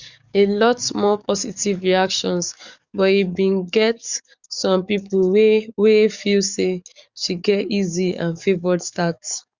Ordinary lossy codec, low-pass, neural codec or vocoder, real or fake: Opus, 64 kbps; 7.2 kHz; codec, 44.1 kHz, 7.8 kbps, DAC; fake